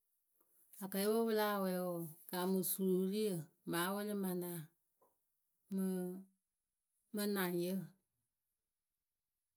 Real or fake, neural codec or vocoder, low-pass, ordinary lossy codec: real; none; none; none